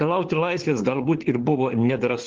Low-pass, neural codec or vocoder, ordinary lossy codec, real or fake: 7.2 kHz; codec, 16 kHz, 4 kbps, FunCodec, trained on LibriTTS, 50 frames a second; Opus, 16 kbps; fake